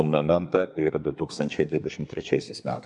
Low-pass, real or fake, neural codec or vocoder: 10.8 kHz; fake; codec, 24 kHz, 1 kbps, SNAC